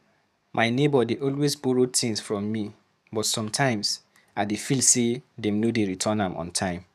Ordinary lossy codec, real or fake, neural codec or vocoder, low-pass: none; fake; codec, 44.1 kHz, 7.8 kbps, DAC; 14.4 kHz